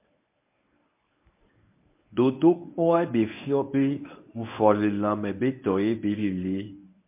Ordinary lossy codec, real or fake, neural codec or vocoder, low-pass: MP3, 32 kbps; fake; codec, 24 kHz, 0.9 kbps, WavTokenizer, medium speech release version 1; 3.6 kHz